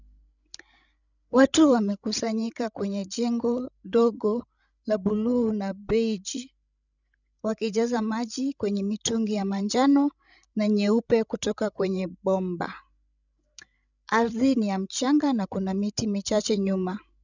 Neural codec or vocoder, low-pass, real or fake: codec, 16 kHz, 16 kbps, FreqCodec, larger model; 7.2 kHz; fake